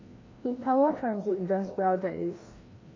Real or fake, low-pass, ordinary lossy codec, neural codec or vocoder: fake; 7.2 kHz; AAC, 32 kbps; codec, 16 kHz, 1 kbps, FreqCodec, larger model